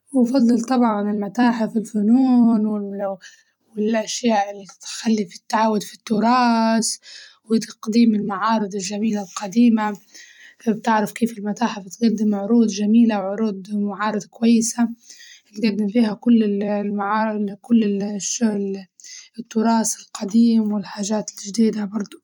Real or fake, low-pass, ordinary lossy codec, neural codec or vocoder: fake; 19.8 kHz; none; vocoder, 44.1 kHz, 128 mel bands every 256 samples, BigVGAN v2